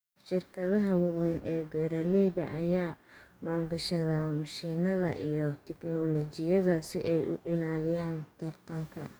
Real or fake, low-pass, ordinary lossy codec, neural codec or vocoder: fake; none; none; codec, 44.1 kHz, 2.6 kbps, DAC